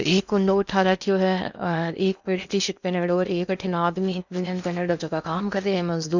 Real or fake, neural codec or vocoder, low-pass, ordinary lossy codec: fake; codec, 16 kHz in and 24 kHz out, 0.8 kbps, FocalCodec, streaming, 65536 codes; 7.2 kHz; none